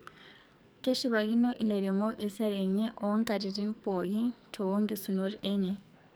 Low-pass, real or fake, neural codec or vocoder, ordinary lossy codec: none; fake; codec, 44.1 kHz, 2.6 kbps, SNAC; none